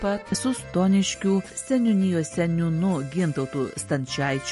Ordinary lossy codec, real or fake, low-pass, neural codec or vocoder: MP3, 48 kbps; real; 14.4 kHz; none